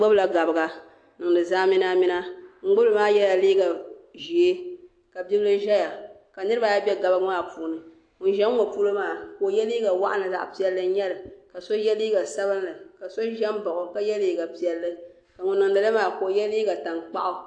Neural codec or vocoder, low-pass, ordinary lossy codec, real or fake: none; 9.9 kHz; AAC, 48 kbps; real